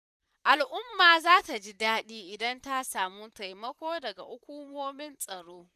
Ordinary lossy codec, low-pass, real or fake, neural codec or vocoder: none; 14.4 kHz; fake; vocoder, 44.1 kHz, 128 mel bands, Pupu-Vocoder